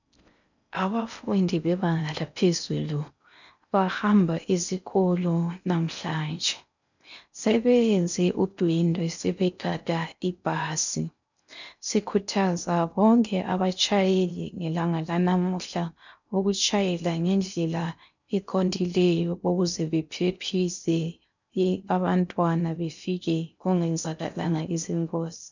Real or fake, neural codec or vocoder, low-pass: fake; codec, 16 kHz in and 24 kHz out, 0.6 kbps, FocalCodec, streaming, 4096 codes; 7.2 kHz